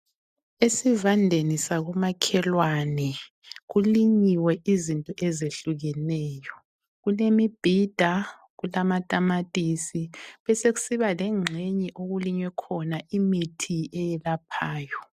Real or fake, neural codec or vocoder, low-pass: real; none; 14.4 kHz